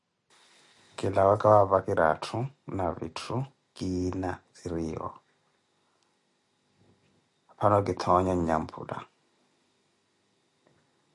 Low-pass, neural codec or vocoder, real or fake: 10.8 kHz; none; real